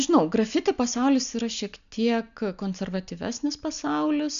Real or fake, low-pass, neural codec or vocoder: real; 7.2 kHz; none